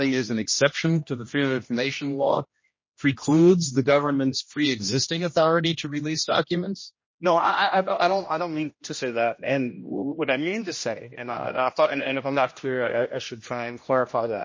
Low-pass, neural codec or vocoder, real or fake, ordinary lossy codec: 7.2 kHz; codec, 16 kHz, 1 kbps, X-Codec, HuBERT features, trained on general audio; fake; MP3, 32 kbps